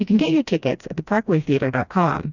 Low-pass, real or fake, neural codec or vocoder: 7.2 kHz; fake; codec, 16 kHz, 1 kbps, FreqCodec, smaller model